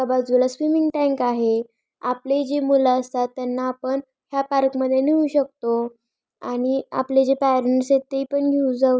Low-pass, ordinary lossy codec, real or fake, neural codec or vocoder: none; none; real; none